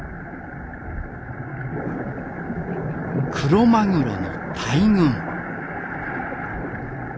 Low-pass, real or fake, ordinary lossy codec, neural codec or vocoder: none; real; none; none